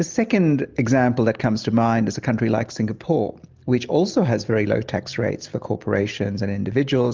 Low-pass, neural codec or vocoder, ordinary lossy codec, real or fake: 7.2 kHz; none; Opus, 24 kbps; real